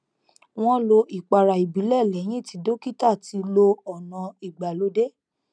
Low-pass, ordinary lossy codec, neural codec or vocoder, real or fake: 9.9 kHz; none; none; real